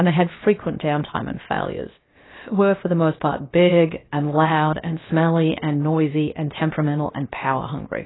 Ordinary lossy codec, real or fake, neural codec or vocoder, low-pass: AAC, 16 kbps; fake; codec, 16 kHz, about 1 kbps, DyCAST, with the encoder's durations; 7.2 kHz